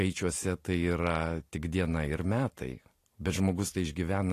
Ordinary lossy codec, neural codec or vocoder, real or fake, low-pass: AAC, 48 kbps; none; real; 14.4 kHz